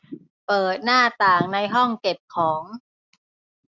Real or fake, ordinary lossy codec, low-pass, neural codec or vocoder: real; none; 7.2 kHz; none